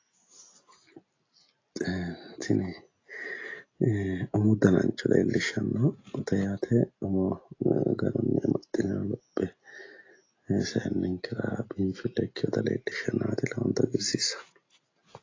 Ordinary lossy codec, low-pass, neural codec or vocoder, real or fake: AAC, 32 kbps; 7.2 kHz; none; real